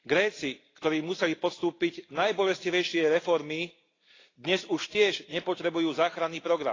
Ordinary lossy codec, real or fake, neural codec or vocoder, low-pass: AAC, 32 kbps; real; none; 7.2 kHz